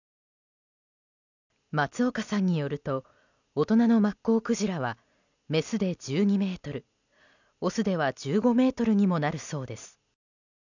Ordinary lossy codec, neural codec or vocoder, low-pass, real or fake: none; none; 7.2 kHz; real